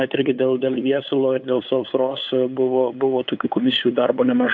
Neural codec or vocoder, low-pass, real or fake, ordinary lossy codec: codec, 16 kHz, 8 kbps, FunCodec, trained on LibriTTS, 25 frames a second; 7.2 kHz; fake; AAC, 48 kbps